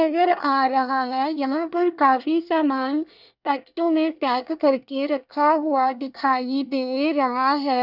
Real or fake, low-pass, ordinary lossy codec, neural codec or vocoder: fake; 5.4 kHz; none; codec, 24 kHz, 1 kbps, SNAC